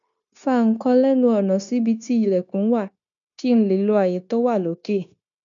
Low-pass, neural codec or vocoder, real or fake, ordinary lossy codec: 7.2 kHz; codec, 16 kHz, 0.9 kbps, LongCat-Audio-Codec; fake; none